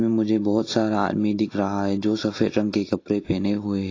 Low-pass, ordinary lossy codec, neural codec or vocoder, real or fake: 7.2 kHz; AAC, 32 kbps; none; real